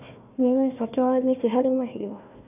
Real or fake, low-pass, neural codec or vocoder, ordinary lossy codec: fake; 3.6 kHz; codec, 16 kHz, 1 kbps, FunCodec, trained on Chinese and English, 50 frames a second; none